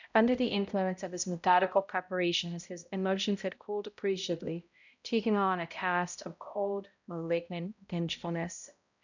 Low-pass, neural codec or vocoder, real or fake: 7.2 kHz; codec, 16 kHz, 0.5 kbps, X-Codec, HuBERT features, trained on balanced general audio; fake